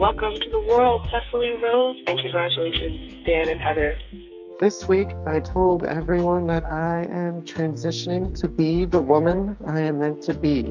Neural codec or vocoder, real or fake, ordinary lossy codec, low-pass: codec, 44.1 kHz, 2.6 kbps, SNAC; fake; MP3, 64 kbps; 7.2 kHz